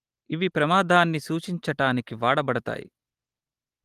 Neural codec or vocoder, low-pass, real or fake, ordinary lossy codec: none; 14.4 kHz; real; Opus, 24 kbps